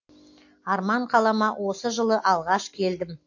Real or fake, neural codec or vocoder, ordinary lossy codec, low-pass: real; none; AAC, 48 kbps; 7.2 kHz